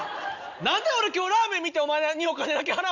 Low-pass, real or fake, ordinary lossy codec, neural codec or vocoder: 7.2 kHz; real; none; none